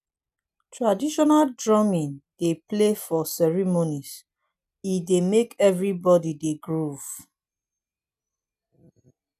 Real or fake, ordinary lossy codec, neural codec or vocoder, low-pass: real; none; none; 14.4 kHz